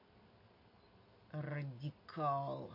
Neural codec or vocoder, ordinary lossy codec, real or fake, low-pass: none; MP3, 32 kbps; real; 5.4 kHz